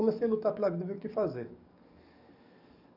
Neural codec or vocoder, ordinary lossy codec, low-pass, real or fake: codec, 44.1 kHz, 7.8 kbps, DAC; none; 5.4 kHz; fake